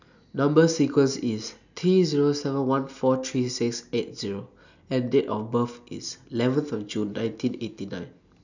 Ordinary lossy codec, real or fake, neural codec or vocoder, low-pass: none; real; none; 7.2 kHz